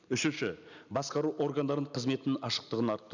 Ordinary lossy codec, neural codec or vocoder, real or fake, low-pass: none; none; real; 7.2 kHz